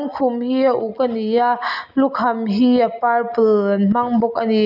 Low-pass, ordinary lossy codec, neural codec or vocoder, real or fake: 5.4 kHz; none; none; real